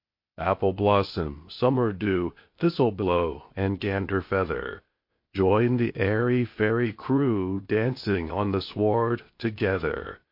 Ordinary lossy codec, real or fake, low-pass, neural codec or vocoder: MP3, 32 kbps; fake; 5.4 kHz; codec, 16 kHz, 0.8 kbps, ZipCodec